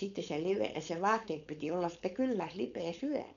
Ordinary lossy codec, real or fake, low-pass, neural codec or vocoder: none; fake; 7.2 kHz; codec, 16 kHz, 4.8 kbps, FACodec